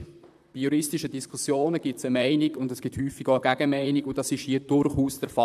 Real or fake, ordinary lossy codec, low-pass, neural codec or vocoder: fake; none; 14.4 kHz; vocoder, 44.1 kHz, 128 mel bands, Pupu-Vocoder